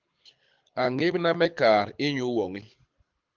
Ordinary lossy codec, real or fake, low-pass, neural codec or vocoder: Opus, 24 kbps; fake; 7.2 kHz; codec, 24 kHz, 6 kbps, HILCodec